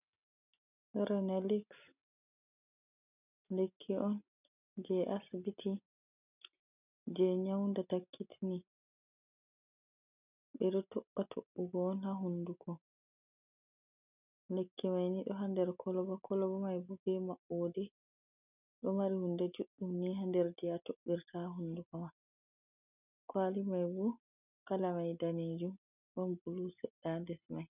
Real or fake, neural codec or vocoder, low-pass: real; none; 3.6 kHz